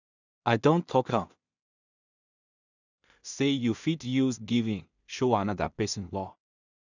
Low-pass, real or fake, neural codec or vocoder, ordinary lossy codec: 7.2 kHz; fake; codec, 16 kHz in and 24 kHz out, 0.4 kbps, LongCat-Audio-Codec, two codebook decoder; none